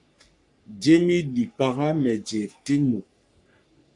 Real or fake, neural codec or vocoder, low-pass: fake; codec, 44.1 kHz, 3.4 kbps, Pupu-Codec; 10.8 kHz